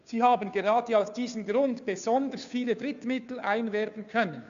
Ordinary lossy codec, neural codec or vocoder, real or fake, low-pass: MP3, 64 kbps; codec, 16 kHz, 2 kbps, FunCodec, trained on Chinese and English, 25 frames a second; fake; 7.2 kHz